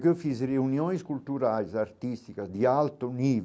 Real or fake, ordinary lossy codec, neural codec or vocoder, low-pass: real; none; none; none